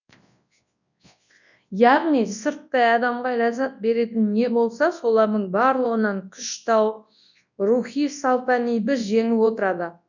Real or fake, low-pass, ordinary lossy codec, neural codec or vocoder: fake; 7.2 kHz; none; codec, 24 kHz, 0.9 kbps, WavTokenizer, large speech release